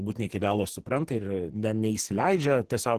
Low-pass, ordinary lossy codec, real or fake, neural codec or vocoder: 14.4 kHz; Opus, 16 kbps; fake; codec, 44.1 kHz, 2.6 kbps, SNAC